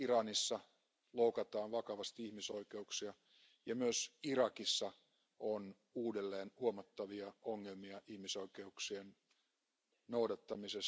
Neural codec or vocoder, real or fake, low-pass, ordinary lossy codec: none; real; none; none